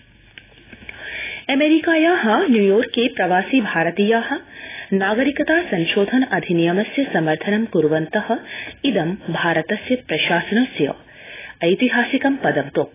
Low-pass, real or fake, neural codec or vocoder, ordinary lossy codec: 3.6 kHz; real; none; AAC, 16 kbps